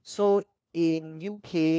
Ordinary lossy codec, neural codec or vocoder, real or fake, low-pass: none; codec, 16 kHz, 1 kbps, FunCodec, trained on LibriTTS, 50 frames a second; fake; none